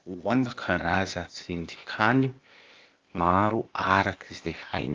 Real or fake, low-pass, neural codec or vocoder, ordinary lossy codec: fake; 7.2 kHz; codec, 16 kHz, 0.8 kbps, ZipCodec; Opus, 32 kbps